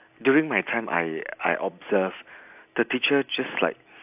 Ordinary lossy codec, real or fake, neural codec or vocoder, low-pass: AAC, 32 kbps; real; none; 3.6 kHz